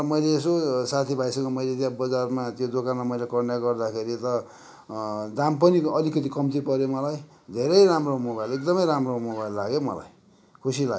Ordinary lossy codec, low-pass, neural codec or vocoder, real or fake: none; none; none; real